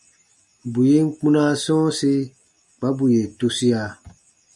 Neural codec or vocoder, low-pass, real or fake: none; 10.8 kHz; real